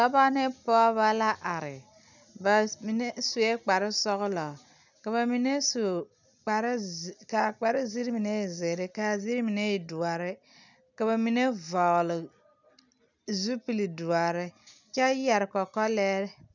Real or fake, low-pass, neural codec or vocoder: real; 7.2 kHz; none